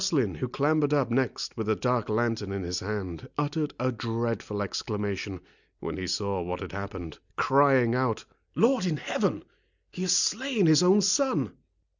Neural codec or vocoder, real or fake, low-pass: none; real; 7.2 kHz